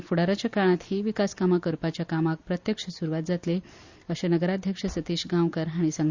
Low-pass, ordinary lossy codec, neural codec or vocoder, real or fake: 7.2 kHz; Opus, 64 kbps; none; real